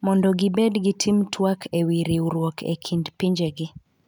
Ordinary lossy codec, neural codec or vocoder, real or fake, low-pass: none; none; real; 19.8 kHz